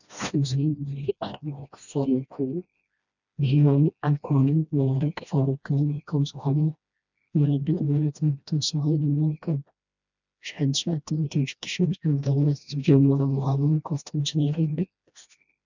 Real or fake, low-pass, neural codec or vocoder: fake; 7.2 kHz; codec, 16 kHz, 1 kbps, FreqCodec, smaller model